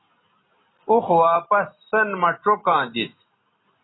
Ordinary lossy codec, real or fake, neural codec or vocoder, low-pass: AAC, 16 kbps; real; none; 7.2 kHz